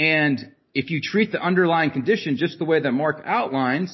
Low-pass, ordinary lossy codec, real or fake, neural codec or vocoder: 7.2 kHz; MP3, 24 kbps; real; none